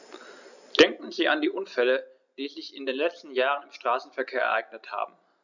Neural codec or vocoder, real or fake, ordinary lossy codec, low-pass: none; real; none; 7.2 kHz